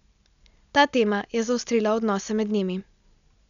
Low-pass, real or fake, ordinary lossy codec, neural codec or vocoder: 7.2 kHz; real; none; none